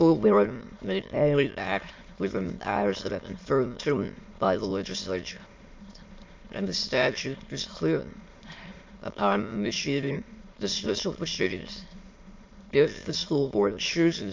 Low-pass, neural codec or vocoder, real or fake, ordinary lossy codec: 7.2 kHz; autoencoder, 22.05 kHz, a latent of 192 numbers a frame, VITS, trained on many speakers; fake; MP3, 48 kbps